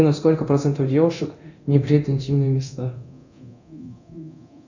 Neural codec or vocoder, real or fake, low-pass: codec, 24 kHz, 0.9 kbps, DualCodec; fake; 7.2 kHz